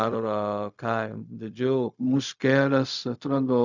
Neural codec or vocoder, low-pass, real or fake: codec, 16 kHz, 0.4 kbps, LongCat-Audio-Codec; 7.2 kHz; fake